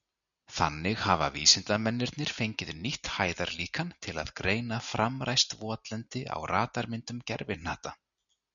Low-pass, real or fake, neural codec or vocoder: 7.2 kHz; real; none